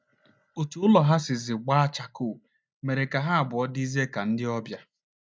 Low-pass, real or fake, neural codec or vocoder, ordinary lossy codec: none; real; none; none